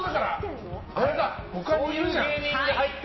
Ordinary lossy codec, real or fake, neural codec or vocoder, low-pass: MP3, 24 kbps; real; none; 7.2 kHz